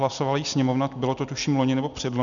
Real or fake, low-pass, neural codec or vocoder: real; 7.2 kHz; none